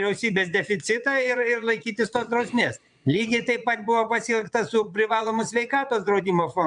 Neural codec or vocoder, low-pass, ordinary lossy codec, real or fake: vocoder, 22.05 kHz, 80 mel bands, Vocos; 9.9 kHz; MP3, 96 kbps; fake